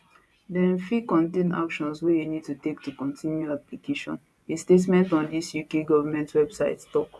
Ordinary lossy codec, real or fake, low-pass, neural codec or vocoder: none; fake; none; vocoder, 24 kHz, 100 mel bands, Vocos